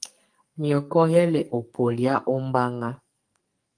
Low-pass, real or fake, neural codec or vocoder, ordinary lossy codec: 9.9 kHz; fake; codec, 44.1 kHz, 2.6 kbps, SNAC; Opus, 32 kbps